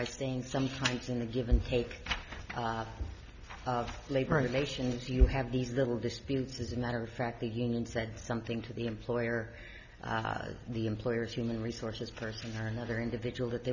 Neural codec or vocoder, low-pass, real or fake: none; 7.2 kHz; real